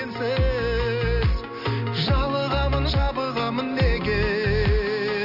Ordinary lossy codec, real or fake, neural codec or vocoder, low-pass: none; real; none; 5.4 kHz